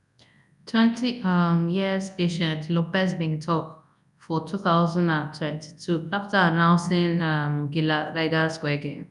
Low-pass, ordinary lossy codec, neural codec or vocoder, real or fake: 10.8 kHz; none; codec, 24 kHz, 0.9 kbps, WavTokenizer, large speech release; fake